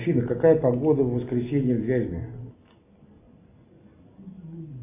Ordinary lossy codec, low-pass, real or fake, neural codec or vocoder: AAC, 32 kbps; 3.6 kHz; real; none